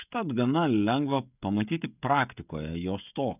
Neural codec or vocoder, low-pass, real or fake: codec, 16 kHz, 16 kbps, FreqCodec, smaller model; 3.6 kHz; fake